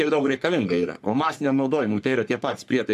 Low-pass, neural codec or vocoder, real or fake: 14.4 kHz; codec, 44.1 kHz, 3.4 kbps, Pupu-Codec; fake